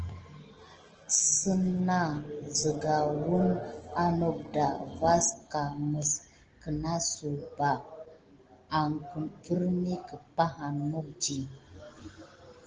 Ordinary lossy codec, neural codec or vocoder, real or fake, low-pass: Opus, 16 kbps; none; real; 7.2 kHz